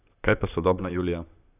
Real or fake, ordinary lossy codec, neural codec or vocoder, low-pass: fake; none; vocoder, 44.1 kHz, 128 mel bands, Pupu-Vocoder; 3.6 kHz